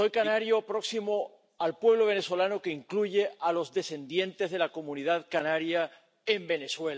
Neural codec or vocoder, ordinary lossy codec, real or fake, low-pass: none; none; real; none